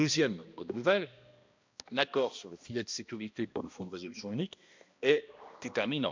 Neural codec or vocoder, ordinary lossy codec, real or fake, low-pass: codec, 16 kHz, 1 kbps, X-Codec, HuBERT features, trained on balanced general audio; MP3, 64 kbps; fake; 7.2 kHz